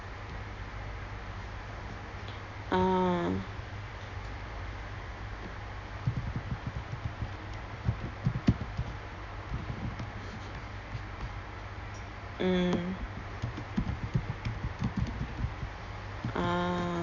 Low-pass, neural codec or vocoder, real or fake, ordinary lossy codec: 7.2 kHz; none; real; none